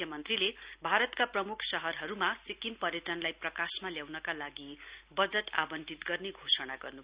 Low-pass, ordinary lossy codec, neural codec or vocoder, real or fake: 3.6 kHz; Opus, 64 kbps; none; real